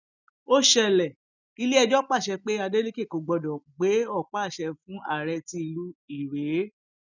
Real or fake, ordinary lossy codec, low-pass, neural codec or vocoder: real; none; 7.2 kHz; none